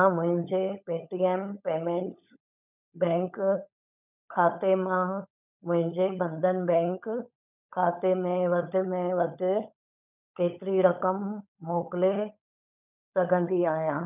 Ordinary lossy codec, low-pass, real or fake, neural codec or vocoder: none; 3.6 kHz; fake; codec, 16 kHz, 16 kbps, FunCodec, trained on LibriTTS, 50 frames a second